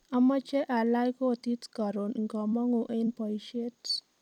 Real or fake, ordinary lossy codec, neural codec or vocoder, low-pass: fake; none; vocoder, 44.1 kHz, 128 mel bands every 256 samples, BigVGAN v2; 19.8 kHz